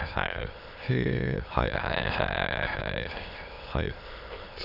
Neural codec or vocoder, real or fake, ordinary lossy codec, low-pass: autoencoder, 22.05 kHz, a latent of 192 numbers a frame, VITS, trained on many speakers; fake; none; 5.4 kHz